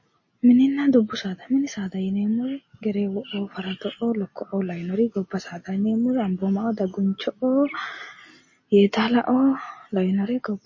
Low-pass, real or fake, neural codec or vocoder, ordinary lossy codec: 7.2 kHz; real; none; MP3, 32 kbps